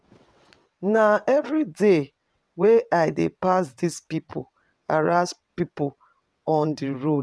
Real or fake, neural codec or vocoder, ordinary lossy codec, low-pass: fake; vocoder, 22.05 kHz, 80 mel bands, WaveNeXt; none; none